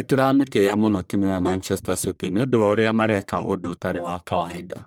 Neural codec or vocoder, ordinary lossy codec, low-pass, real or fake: codec, 44.1 kHz, 1.7 kbps, Pupu-Codec; none; none; fake